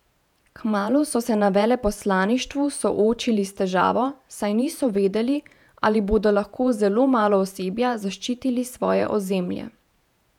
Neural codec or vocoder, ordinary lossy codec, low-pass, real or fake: vocoder, 48 kHz, 128 mel bands, Vocos; none; 19.8 kHz; fake